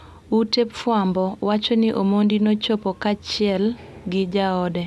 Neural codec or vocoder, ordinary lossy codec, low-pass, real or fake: none; none; none; real